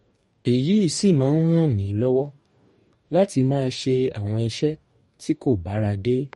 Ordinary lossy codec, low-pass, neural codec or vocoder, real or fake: MP3, 48 kbps; 19.8 kHz; codec, 44.1 kHz, 2.6 kbps, DAC; fake